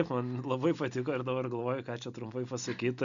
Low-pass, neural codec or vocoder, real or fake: 7.2 kHz; none; real